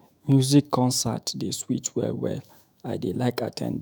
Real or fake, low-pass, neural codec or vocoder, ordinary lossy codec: fake; none; autoencoder, 48 kHz, 128 numbers a frame, DAC-VAE, trained on Japanese speech; none